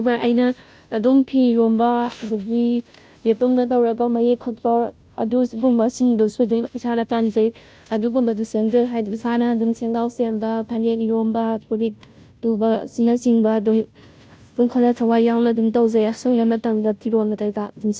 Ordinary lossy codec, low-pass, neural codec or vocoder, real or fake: none; none; codec, 16 kHz, 0.5 kbps, FunCodec, trained on Chinese and English, 25 frames a second; fake